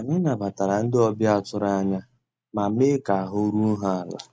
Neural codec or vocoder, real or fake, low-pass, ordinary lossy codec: none; real; none; none